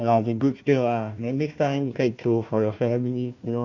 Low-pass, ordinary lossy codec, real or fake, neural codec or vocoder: 7.2 kHz; none; fake; codec, 16 kHz, 1 kbps, FunCodec, trained on Chinese and English, 50 frames a second